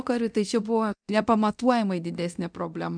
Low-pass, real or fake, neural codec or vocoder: 9.9 kHz; fake; codec, 24 kHz, 0.9 kbps, DualCodec